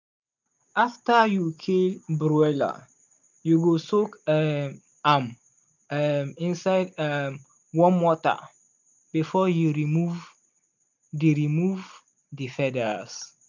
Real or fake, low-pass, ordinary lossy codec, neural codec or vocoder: real; 7.2 kHz; none; none